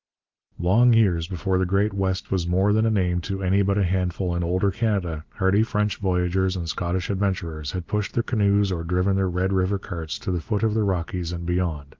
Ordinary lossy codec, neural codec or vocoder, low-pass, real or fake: Opus, 24 kbps; none; 7.2 kHz; real